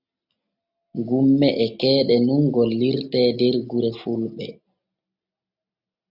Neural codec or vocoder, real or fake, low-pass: none; real; 5.4 kHz